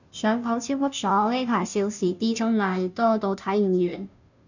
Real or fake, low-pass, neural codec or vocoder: fake; 7.2 kHz; codec, 16 kHz, 0.5 kbps, FunCodec, trained on Chinese and English, 25 frames a second